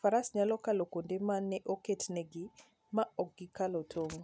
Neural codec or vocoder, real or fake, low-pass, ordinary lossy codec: none; real; none; none